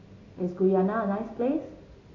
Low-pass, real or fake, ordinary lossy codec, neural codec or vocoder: 7.2 kHz; real; AAC, 32 kbps; none